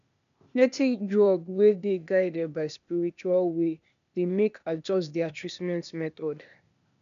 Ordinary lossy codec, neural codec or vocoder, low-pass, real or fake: MP3, 96 kbps; codec, 16 kHz, 0.8 kbps, ZipCodec; 7.2 kHz; fake